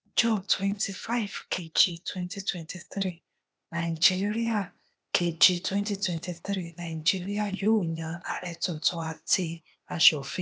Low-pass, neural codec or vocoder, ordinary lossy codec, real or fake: none; codec, 16 kHz, 0.8 kbps, ZipCodec; none; fake